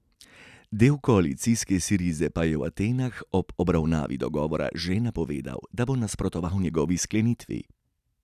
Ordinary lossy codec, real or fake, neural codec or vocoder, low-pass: none; real; none; 14.4 kHz